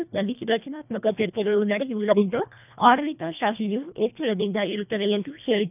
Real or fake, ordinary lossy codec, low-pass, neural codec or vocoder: fake; none; 3.6 kHz; codec, 24 kHz, 1.5 kbps, HILCodec